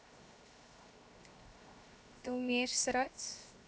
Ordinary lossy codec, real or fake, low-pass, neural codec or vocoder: none; fake; none; codec, 16 kHz, 0.7 kbps, FocalCodec